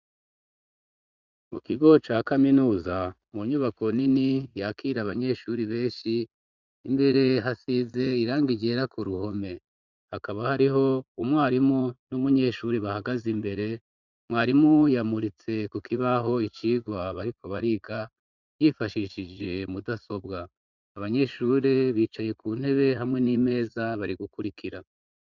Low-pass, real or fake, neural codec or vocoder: 7.2 kHz; fake; vocoder, 44.1 kHz, 80 mel bands, Vocos